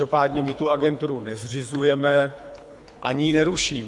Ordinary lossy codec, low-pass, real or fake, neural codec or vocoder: AAC, 64 kbps; 10.8 kHz; fake; codec, 24 kHz, 3 kbps, HILCodec